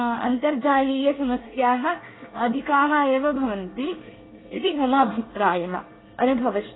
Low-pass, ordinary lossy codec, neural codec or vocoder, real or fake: 7.2 kHz; AAC, 16 kbps; codec, 24 kHz, 1 kbps, SNAC; fake